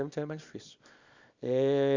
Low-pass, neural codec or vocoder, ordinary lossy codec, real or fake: 7.2 kHz; codec, 24 kHz, 0.9 kbps, WavTokenizer, small release; Opus, 64 kbps; fake